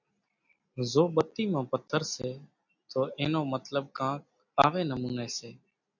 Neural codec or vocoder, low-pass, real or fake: none; 7.2 kHz; real